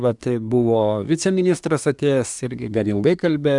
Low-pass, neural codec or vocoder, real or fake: 10.8 kHz; codec, 24 kHz, 1 kbps, SNAC; fake